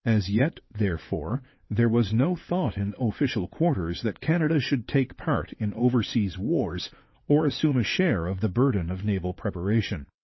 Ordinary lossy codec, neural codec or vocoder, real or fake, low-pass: MP3, 24 kbps; vocoder, 22.05 kHz, 80 mel bands, WaveNeXt; fake; 7.2 kHz